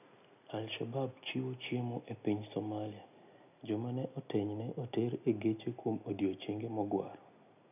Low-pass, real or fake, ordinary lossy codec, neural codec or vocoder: 3.6 kHz; real; none; none